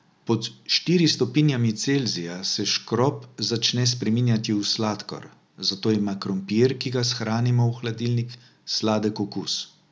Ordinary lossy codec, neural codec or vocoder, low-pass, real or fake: none; none; none; real